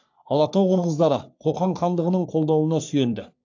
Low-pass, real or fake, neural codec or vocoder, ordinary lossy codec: 7.2 kHz; fake; codec, 44.1 kHz, 3.4 kbps, Pupu-Codec; AAC, 48 kbps